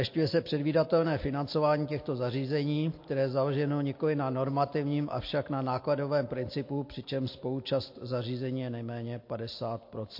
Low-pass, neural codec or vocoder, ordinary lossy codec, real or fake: 5.4 kHz; none; MP3, 32 kbps; real